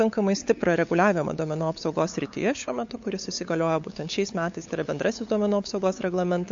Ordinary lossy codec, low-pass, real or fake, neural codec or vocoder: MP3, 48 kbps; 7.2 kHz; fake; codec, 16 kHz, 8 kbps, FunCodec, trained on LibriTTS, 25 frames a second